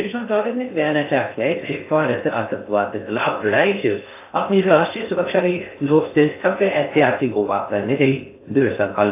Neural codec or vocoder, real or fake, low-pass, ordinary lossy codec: codec, 16 kHz in and 24 kHz out, 0.6 kbps, FocalCodec, streaming, 4096 codes; fake; 3.6 kHz; AAC, 24 kbps